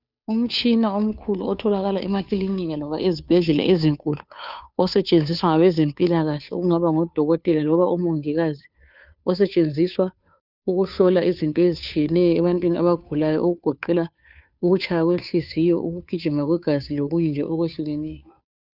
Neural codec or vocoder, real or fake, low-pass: codec, 16 kHz, 2 kbps, FunCodec, trained on Chinese and English, 25 frames a second; fake; 5.4 kHz